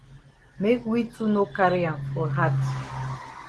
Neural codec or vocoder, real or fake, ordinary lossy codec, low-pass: none; real; Opus, 16 kbps; 10.8 kHz